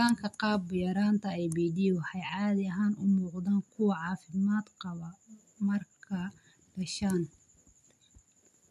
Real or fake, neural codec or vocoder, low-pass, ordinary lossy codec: real; none; 14.4 kHz; MP3, 64 kbps